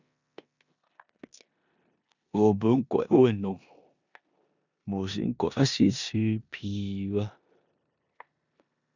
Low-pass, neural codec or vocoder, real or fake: 7.2 kHz; codec, 16 kHz in and 24 kHz out, 0.9 kbps, LongCat-Audio-Codec, four codebook decoder; fake